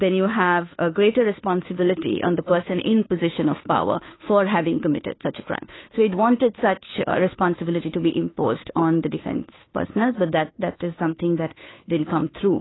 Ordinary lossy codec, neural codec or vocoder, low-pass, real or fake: AAC, 16 kbps; codec, 16 kHz, 2 kbps, FunCodec, trained on Chinese and English, 25 frames a second; 7.2 kHz; fake